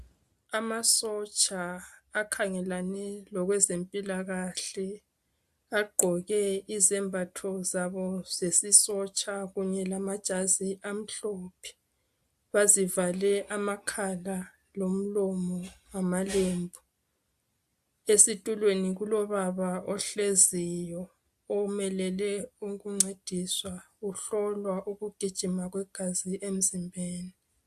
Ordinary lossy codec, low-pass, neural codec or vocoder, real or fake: AAC, 96 kbps; 14.4 kHz; none; real